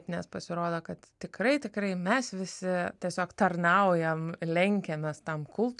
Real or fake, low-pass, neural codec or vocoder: real; 10.8 kHz; none